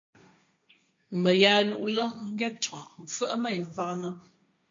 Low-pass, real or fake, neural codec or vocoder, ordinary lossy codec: 7.2 kHz; fake; codec, 16 kHz, 1.1 kbps, Voila-Tokenizer; MP3, 48 kbps